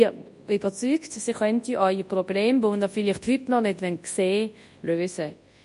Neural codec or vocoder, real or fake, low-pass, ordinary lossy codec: codec, 24 kHz, 0.9 kbps, WavTokenizer, large speech release; fake; 10.8 kHz; MP3, 48 kbps